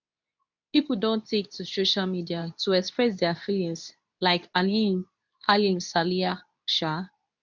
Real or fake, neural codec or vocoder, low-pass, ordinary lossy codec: fake; codec, 24 kHz, 0.9 kbps, WavTokenizer, medium speech release version 2; 7.2 kHz; none